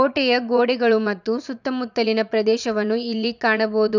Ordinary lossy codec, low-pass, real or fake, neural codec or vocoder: none; 7.2 kHz; fake; vocoder, 44.1 kHz, 80 mel bands, Vocos